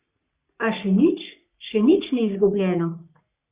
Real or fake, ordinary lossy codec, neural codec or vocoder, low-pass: fake; Opus, 64 kbps; codec, 44.1 kHz, 3.4 kbps, Pupu-Codec; 3.6 kHz